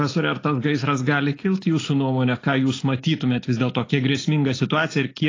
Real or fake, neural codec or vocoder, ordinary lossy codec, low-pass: real; none; AAC, 32 kbps; 7.2 kHz